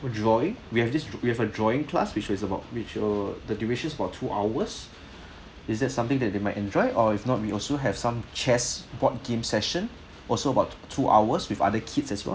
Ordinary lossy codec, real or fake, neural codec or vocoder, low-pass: none; real; none; none